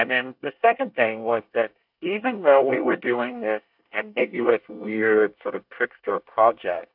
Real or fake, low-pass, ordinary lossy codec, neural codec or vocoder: fake; 5.4 kHz; AAC, 48 kbps; codec, 24 kHz, 1 kbps, SNAC